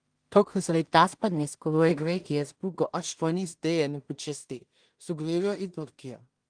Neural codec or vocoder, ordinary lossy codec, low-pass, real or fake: codec, 16 kHz in and 24 kHz out, 0.4 kbps, LongCat-Audio-Codec, two codebook decoder; Opus, 24 kbps; 9.9 kHz; fake